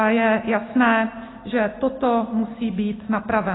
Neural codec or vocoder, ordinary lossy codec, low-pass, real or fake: none; AAC, 16 kbps; 7.2 kHz; real